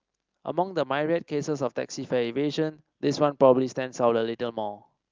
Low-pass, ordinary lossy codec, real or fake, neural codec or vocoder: 7.2 kHz; Opus, 24 kbps; real; none